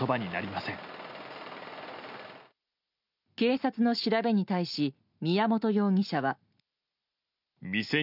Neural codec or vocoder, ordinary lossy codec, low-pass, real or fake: none; none; 5.4 kHz; real